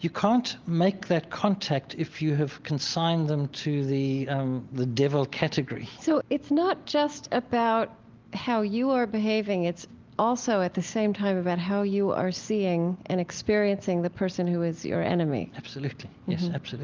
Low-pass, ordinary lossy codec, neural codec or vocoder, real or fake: 7.2 kHz; Opus, 32 kbps; none; real